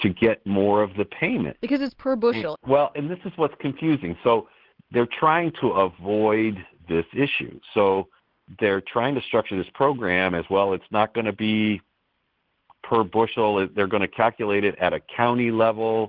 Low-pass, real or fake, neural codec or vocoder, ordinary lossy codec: 5.4 kHz; real; none; Opus, 16 kbps